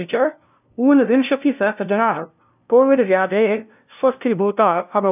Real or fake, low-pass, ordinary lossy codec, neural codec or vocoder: fake; 3.6 kHz; none; codec, 16 kHz, 0.5 kbps, FunCodec, trained on LibriTTS, 25 frames a second